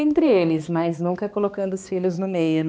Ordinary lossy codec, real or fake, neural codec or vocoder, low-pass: none; fake; codec, 16 kHz, 2 kbps, X-Codec, HuBERT features, trained on balanced general audio; none